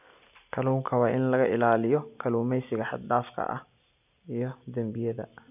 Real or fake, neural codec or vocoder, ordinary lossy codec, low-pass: real; none; none; 3.6 kHz